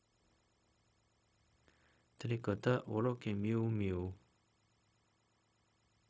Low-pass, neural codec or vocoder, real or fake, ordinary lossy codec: none; codec, 16 kHz, 0.4 kbps, LongCat-Audio-Codec; fake; none